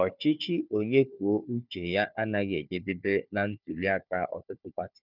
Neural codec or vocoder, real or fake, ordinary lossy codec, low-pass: codec, 16 kHz, 4 kbps, FunCodec, trained on Chinese and English, 50 frames a second; fake; none; 5.4 kHz